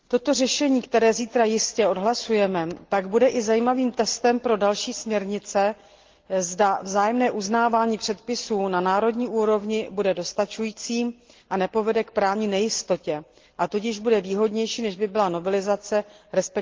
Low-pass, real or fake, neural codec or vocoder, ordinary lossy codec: 7.2 kHz; real; none; Opus, 16 kbps